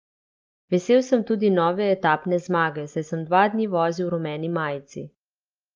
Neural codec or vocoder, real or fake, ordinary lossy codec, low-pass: none; real; Opus, 32 kbps; 7.2 kHz